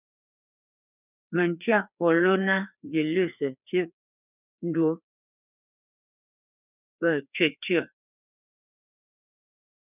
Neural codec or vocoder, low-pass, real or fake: codec, 16 kHz, 2 kbps, FreqCodec, larger model; 3.6 kHz; fake